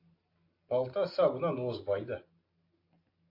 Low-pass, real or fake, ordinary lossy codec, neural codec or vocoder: 5.4 kHz; real; AAC, 32 kbps; none